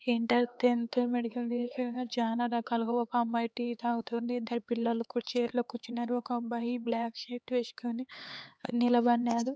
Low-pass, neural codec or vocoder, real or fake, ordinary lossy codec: none; codec, 16 kHz, 4 kbps, X-Codec, HuBERT features, trained on LibriSpeech; fake; none